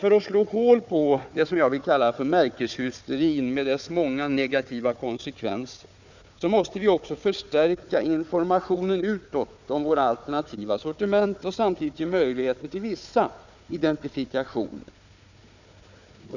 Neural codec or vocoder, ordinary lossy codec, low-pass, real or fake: codec, 16 kHz, 4 kbps, FunCodec, trained on Chinese and English, 50 frames a second; none; 7.2 kHz; fake